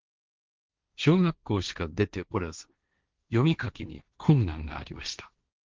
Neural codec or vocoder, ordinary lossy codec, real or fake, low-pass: codec, 16 kHz, 1.1 kbps, Voila-Tokenizer; Opus, 32 kbps; fake; 7.2 kHz